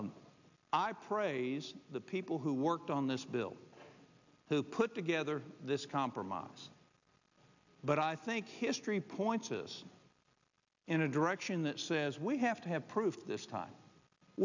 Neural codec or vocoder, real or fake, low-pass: none; real; 7.2 kHz